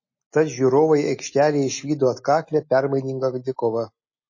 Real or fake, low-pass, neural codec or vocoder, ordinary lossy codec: real; 7.2 kHz; none; MP3, 32 kbps